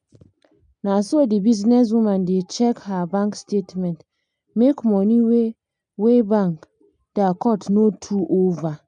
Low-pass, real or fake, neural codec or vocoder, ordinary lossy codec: 9.9 kHz; real; none; none